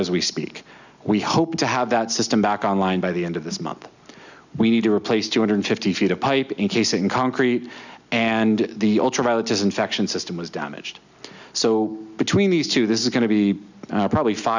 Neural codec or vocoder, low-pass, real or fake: none; 7.2 kHz; real